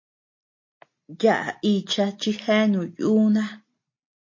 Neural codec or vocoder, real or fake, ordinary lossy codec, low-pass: none; real; MP3, 32 kbps; 7.2 kHz